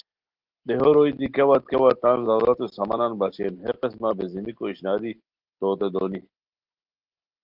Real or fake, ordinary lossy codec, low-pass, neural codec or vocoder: real; Opus, 16 kbps; 5.4 kHz; none